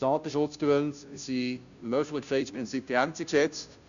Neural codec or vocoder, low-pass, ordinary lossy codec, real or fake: codec, 16 kHz, 0.5 kbps, FunCodec, trained on Chinese and English, 25 frames a second; 7.2 kHz; none; fake